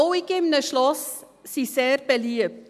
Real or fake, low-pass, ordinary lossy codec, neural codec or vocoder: real; 14.4 kHz; none; none